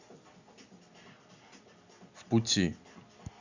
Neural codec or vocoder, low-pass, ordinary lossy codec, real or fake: none; 7.2 kHz; Opus, 64 kbps; real